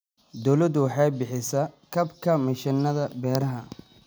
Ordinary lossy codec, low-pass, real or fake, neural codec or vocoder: none; none; real; none